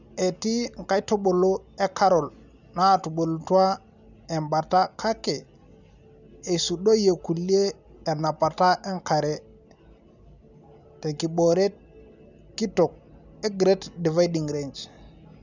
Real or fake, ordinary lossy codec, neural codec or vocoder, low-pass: real; none; none; 7.2 kHz